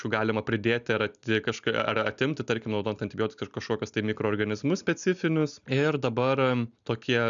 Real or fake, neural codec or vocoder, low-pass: real; none; 7.2 kHz